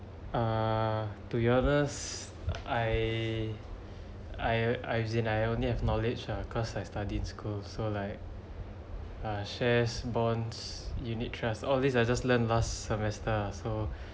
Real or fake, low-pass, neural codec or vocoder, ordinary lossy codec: real; none; none; none